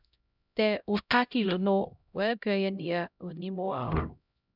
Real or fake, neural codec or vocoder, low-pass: fake; codec, 16 kHz, 0.5 kbps, X-Codec, HuBERT features, trained on LibriSpeech; 5.4 kHz